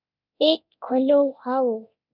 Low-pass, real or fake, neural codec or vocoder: 5.4 kHz; fake; codec, 24 kHz, 0.9 kbps, DualCodec